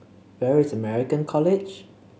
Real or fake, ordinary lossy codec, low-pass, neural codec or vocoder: real; none; none; none